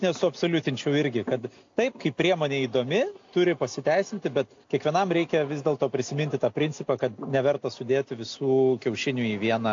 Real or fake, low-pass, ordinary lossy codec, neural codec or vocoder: real; 7.2 kHz; AAC, 48 kbps; none